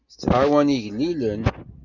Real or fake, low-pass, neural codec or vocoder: real; 7.2 kHz; none